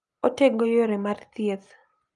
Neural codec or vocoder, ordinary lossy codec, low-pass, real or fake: none; Opus, 32 kbps; 10.8 kHz; real